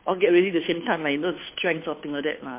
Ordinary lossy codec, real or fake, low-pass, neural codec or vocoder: MP3, 24 kbps; fake; 3.6 kHz; codec, 16 kHz, 2 kbps, FunCodec, trained on Chinese and English, 25 frames a second